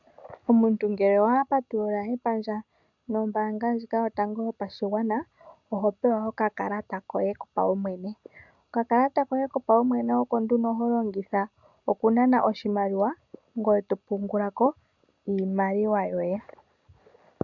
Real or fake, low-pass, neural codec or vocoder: real; 7.2 kHz; none